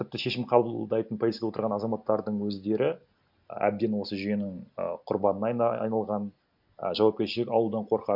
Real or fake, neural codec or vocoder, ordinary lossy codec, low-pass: real; none; MP3, 48 kbps; 5.4 kHz